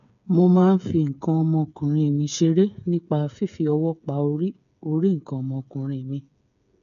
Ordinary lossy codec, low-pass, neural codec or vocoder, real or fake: none; 7.2 kHz; codec, 16 kHz, 8 kbps, FreqCodec, smaller model; fake